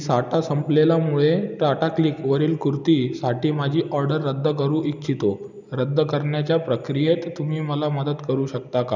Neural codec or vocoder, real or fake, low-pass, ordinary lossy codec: none; real; 7.2 kHz; none